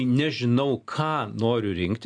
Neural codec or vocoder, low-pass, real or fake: none; 9.9 kHz; real